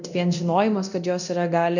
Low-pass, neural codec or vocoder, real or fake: 7.2 kHz; codec, 24 kHz, 0.9 kbps, DualCodec; fake